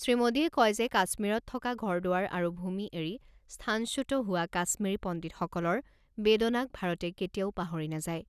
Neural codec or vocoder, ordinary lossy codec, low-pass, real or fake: none; none; 14.4 kHz; real